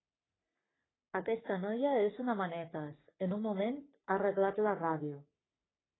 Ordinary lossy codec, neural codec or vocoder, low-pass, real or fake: AAC, 16 kbps; codec, 44.1 kHz, 3.4 kbps, Pupu-Codec; 7.2 kHz; fake